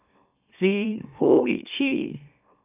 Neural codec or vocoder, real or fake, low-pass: autoencoder, 44.1 kHz, a latent of 192 numbers a frame, MeloTTS; fake; 3.6 kHz